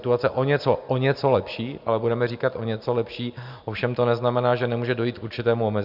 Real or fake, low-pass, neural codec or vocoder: real; 5.4 kHz; none